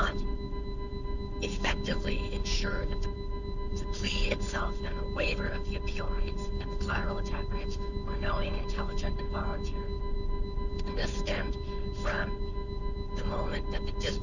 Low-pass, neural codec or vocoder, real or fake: 7.2 kHz; codec, 16 kHz, 2 kbps, FunCodec, trained on Chinese and English, 25 frames a second; fake